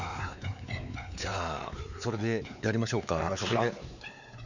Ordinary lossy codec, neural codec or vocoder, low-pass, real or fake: none; codec, 16 kHz, 4 kbps, X-Codec, WavLM features, trained on Multilingual LibriSpeech; 7.2 kHz; fake